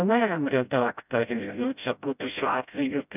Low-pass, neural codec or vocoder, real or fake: 3.6 kHz; codec, 16 kHz, 0.5 kbps, FreqCodec, smaller model; fake